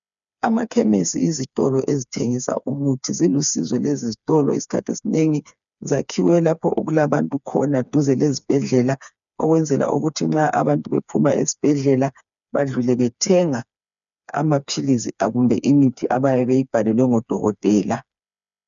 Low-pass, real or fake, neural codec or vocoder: 7.2 kHz; fake; codec, 16 kHz, 4 kbps, FreqCodec, smaller model